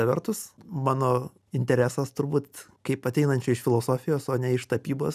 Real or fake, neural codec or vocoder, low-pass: real; none; 14.4 kHz